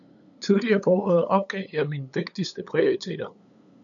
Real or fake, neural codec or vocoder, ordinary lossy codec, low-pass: fake; codec, 16 kHz, 8 kbps, FunCodec, trained on LibriTTS, 25 frames a second; MP3, 96 kbps; 7.2 kHz